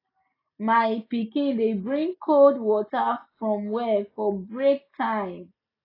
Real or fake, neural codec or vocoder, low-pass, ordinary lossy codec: real; none; 5.4 kHz; AAC, 24 kbps